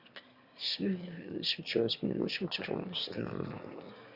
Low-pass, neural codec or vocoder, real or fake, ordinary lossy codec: 5.4 kHz; autoencoder, 22.05 kHz, a latent of 192 numbers a frame, VITS, trained on one speaker; fake; Opus, 64 kbps